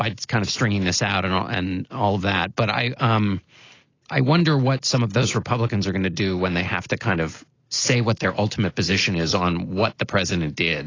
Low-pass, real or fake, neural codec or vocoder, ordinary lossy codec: 7.2 kHz; real; none; AAC, 32 kbps